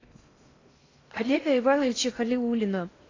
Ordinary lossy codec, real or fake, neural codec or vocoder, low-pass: AAC, 32 kbps; fake; codec, 16 kHz in and 24 kHz out, 0.6 kbps, FocalCodec, streaming, 4096 codes; 7.2 kHz